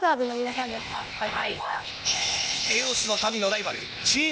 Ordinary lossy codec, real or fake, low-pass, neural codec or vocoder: none; fake; none; codec, 16 kHz, 0.8 kbps, ZipCodec